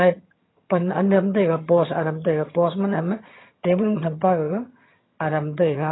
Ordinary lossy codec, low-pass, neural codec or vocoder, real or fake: AAC, 16 kbps; 7.2 kHz; vocoder, 22.05 kHz, 80 mel bands, HiFi-GAN; fake